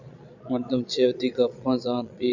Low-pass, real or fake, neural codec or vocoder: 7.2 kHz; fake; vocoder, 22.05 kHz, 80 mel bands, Vocos